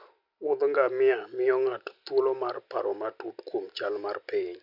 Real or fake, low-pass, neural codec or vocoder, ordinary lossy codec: real; 5.4 kHz; none; none